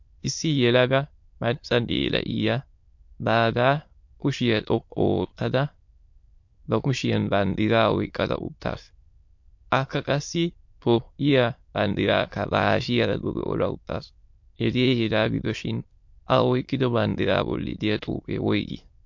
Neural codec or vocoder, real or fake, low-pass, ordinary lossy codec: autoencoder, 22.05 kHz, a latent of 192 numbers a frame, VITS, trained on many speakers; fake; 7.2 kHz; MP3, 48 kbps